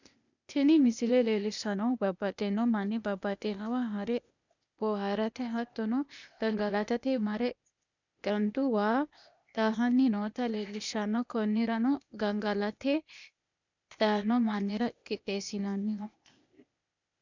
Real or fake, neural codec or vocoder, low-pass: fake; codec, 16 kHz, 0.8 kbps, ZipCodec; 7.2 kHz